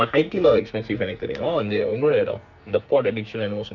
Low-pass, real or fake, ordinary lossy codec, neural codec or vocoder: 7.2 kHz; fake; none; codec, 44.1 kHz, 2.6 kbps, SNAC